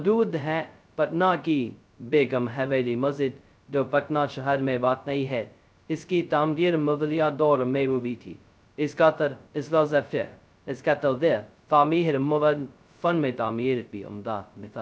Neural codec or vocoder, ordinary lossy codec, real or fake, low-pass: codec, 16 kHz, 0.2 kbps, FocalCodec; none; fake; none